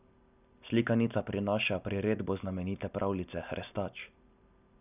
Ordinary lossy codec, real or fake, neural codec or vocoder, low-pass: none; real; none; 3.6 kHz